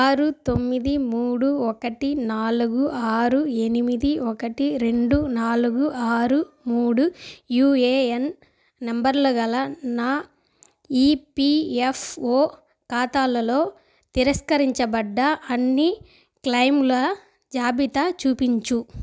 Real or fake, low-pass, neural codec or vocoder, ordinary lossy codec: real; none; none; none